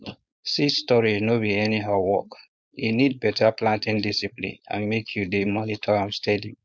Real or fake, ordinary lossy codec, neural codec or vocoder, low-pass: fake; none; codec, 16 kHz, 4.8 kbps, FACodec; none